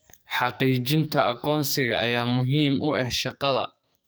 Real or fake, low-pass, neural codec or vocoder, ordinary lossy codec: fake; none; codec, 44.1 kHz, 2.6 kbps, SNAC; none